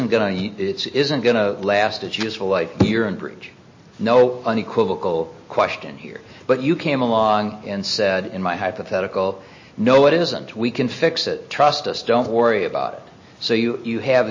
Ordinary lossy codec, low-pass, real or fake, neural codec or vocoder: MP3, 32 kbps; 7.2 kHz; real; none